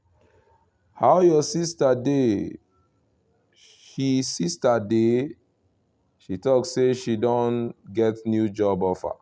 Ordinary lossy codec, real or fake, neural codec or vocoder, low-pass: none; real; none; none